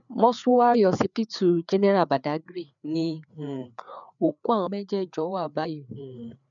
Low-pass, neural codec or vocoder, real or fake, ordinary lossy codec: 7.2 kHz; codec, 16 kHz, 4 kbps, FreqCodec, larger model; fake; none